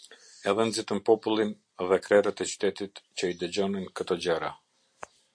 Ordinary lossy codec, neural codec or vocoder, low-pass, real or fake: MP3, 48 kbps; none; 9.9 kHz; real